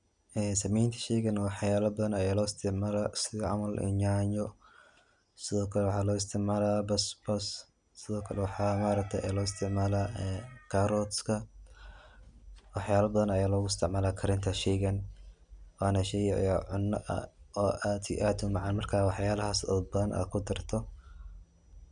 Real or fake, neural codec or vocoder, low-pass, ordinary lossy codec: real; none; 9.9 kHz; none